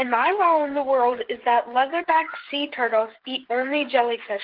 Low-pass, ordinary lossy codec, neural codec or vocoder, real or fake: 5.4 kHz; Opus, 16 kbps; codec, 16 kHz, 8 kbps, FreqCodec, smaller model; fake